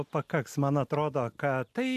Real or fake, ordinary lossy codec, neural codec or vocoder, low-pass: real; AAC, 96 kbps; none; 14.4 kHz